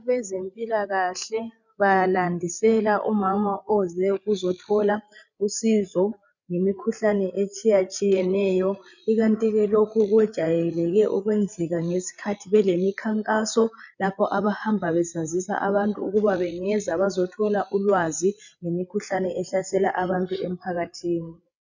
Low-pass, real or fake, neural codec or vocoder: 7.2 kHz; fake; codec, 16 kHz, 8 kbps, FreqCodec, larger model